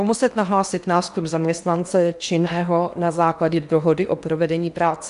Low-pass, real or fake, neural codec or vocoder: 10.8 kHz; fake; codec, 16 kHz in and 24 kHz out, 0.8 kbps, FocalCodec, streaming, 65536 codes